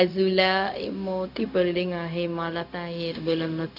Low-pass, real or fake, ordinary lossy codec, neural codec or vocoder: 5.4 kHz; fake; none; codec, 16 kHz, 0.4 kbps, LongCat-Audio-Codec